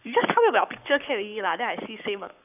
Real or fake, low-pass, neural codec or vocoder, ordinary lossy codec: fake; 3.6 kHz; codec, 44.1 kHz, 7.8 kbps, Pupu-Codec; none